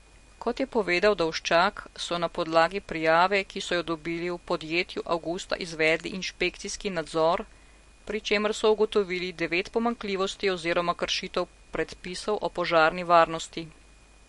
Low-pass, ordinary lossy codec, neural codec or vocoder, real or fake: 14.4 kHz; MP3, 48 kbps; none; real